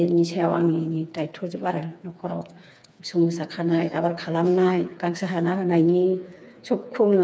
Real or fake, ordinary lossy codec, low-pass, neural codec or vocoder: fake; none; none; codec, 16 kHz, 4 kbps, FreqCodec, smaller model